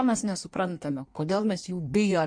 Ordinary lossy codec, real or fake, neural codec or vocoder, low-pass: MP3, 48 kbps; fake; codec, 16 kHz in and 24 kHz out, 1.1 kbps, FireRedTTS-2 codec; 9.9 kHz